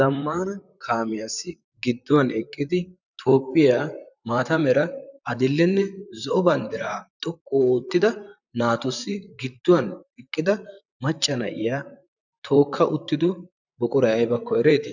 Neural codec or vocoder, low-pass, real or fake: vocoder, 44.1 kHz, 128 mel bands, Pupu-Vocoder; 7.2 kHz; fake